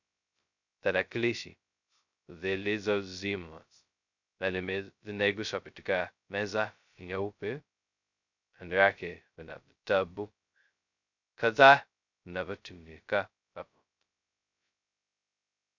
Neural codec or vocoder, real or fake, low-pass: codec, 16 kHz, 0.2 kbps, FocalCodec; fake; 7.2 kHz